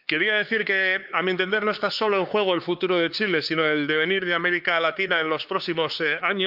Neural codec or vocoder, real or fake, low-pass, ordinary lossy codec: codec, 16 kHz, 4 kbps, X-Codec, HuBERT features, trained on LibriSpeech; fake; 5.4 kHz; Opus, 32 kbps